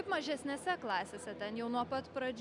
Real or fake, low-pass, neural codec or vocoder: real; 10.8 kHz; none